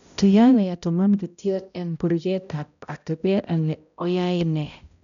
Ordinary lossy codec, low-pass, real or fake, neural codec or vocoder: none; 7.2 kHz; fake; codec, 16 kHz, 0.5 kbps, X-Codec, HuBERT features, trained on balanced general audio